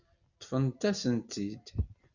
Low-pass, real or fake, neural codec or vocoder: 7.2 kHz; real; none